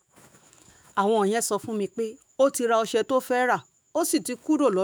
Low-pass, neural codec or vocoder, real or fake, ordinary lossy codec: none; autoencoder, 48 kHz, 128 numbers a frame, DAC-VAE, trained on Japanese speech; fake; none